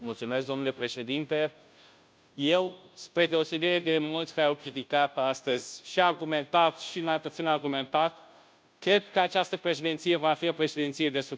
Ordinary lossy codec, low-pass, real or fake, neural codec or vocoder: none; none; fake; codec, 16 kHz, 0.5 kbps, FunCodec, trained on Chinese and English, 25 frames a second